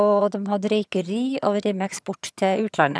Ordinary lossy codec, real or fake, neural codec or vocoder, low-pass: none; fake; vocoder, 22.05 kHz, 80 mel bands, HiFi-GAN; none